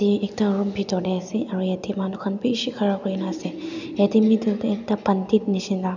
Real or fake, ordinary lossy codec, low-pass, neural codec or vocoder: real; none; 7.2 kHz; none